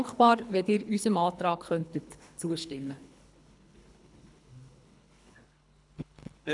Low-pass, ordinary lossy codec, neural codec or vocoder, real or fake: 10.8 kHz; none; codec, 24 kHz, 3 kbps, HILCodec; fake